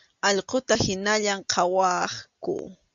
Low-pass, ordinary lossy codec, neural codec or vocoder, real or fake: 7.2 kHz; Opus, 64 kbps; none; real